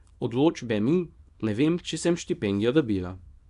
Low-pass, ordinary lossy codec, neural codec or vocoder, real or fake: 10.8 kHz; none; codec, 24 kHz, 0.9 kbps, WavTokenizer, small release; fake